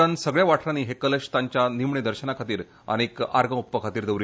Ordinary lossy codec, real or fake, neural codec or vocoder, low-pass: none; real; none; none